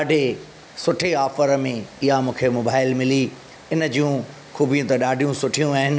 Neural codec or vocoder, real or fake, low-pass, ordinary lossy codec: none; real; none; none